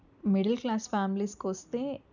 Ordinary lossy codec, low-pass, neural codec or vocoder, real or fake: none; 7.2 kHz; none; real